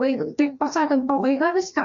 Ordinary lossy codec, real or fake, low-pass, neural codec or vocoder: MP3, 64 kbps; fake; 7.2 kHz; codec, 16 kHz, 1 kbps, FreqCodec, larger model